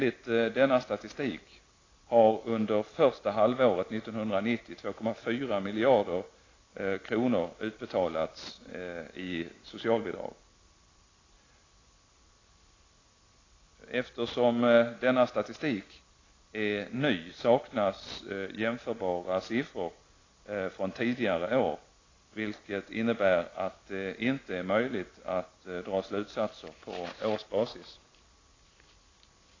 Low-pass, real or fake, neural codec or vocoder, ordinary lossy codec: 7.2 kHz; real; none; AAC, 32 kbps